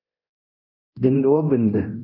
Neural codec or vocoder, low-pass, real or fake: codec, 24 kHz, 0.9 kbps, DualCodec; 5.4 kHz; fake